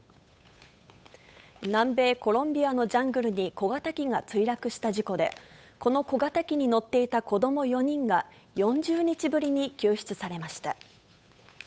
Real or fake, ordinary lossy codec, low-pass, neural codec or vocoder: fake; none; none; codec, 16 kHz, 8 kbps, FunCodec, trained on Chinese and English, 25 frames a second